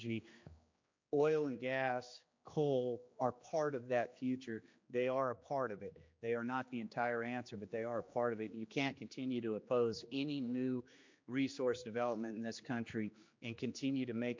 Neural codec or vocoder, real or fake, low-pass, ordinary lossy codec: codec, 16 kHz, 2 kbps, X-Codec, HuBERT features, trained on general audio; fake; 7.2 kHz; MP3, 48 kbps